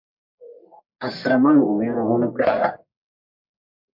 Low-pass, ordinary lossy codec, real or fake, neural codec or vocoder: 5.4 kHz; MP3, 48 kbps; fake; codec, 44.1 kHz, 1.7 kbps, Pupu-Codec